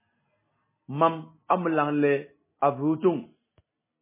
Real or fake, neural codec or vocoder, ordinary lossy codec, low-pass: real; none; MP3, 16 kbps; 3.6 kHz